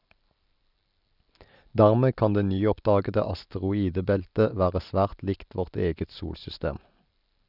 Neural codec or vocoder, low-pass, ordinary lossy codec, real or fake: none; 5.4 kHz; none; real